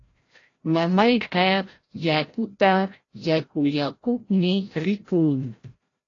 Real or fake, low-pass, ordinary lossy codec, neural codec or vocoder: fake; 7.2 kHz; AAC, 32 kbps; codec, 16 kHz, 0.5 kbps, FreqCodec, larger model